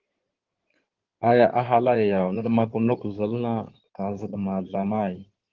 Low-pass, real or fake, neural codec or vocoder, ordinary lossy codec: 7.2 kHz; fake; codec, 16 kHz in and 24 kHz out, 2.2 kbps, FireRedTTS-2 codec; Opus, 16 kbps